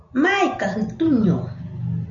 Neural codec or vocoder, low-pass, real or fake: none; 7.2 kHz; real